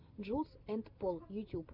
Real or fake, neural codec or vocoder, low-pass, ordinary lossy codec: real; none; 5.4 kHz; MP3, 48 kbps